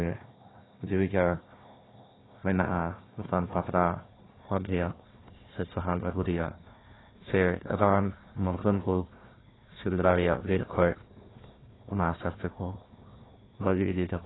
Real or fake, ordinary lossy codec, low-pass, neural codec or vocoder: fake; AAC, 16 kbps; 7.2 kHz; codec, 16 kHz, 1 kbps, FunCodec, trained on Chinese and English, 50 frames a second